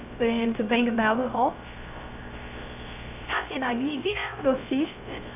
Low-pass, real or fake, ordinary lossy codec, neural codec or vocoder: 3.6 kHz; fake; AAC, 32 kbps; codec, 16 kHz, 0.3 kbps, FocalCodec